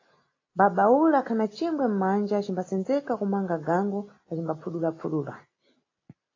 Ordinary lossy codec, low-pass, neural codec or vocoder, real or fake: AAC, 32 kbps; 7.2 kHz; none; real